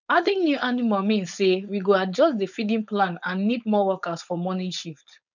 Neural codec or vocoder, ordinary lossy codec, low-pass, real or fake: codec, 16 kHz, 4.8 kbps, FACodec; none; 7.2 kHz; fake